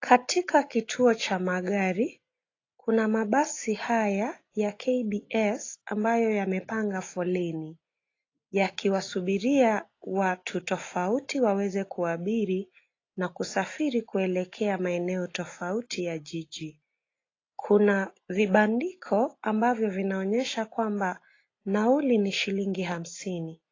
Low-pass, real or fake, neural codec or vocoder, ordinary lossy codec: 7.2 kHz; real; none; AAC, 32 kbps